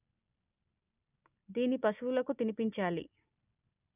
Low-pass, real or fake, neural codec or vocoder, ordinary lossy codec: 3.6 kHz; real; none; none